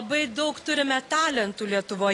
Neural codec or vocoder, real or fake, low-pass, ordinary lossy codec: none; real; 10.8 kHz; AAC, 32 kbps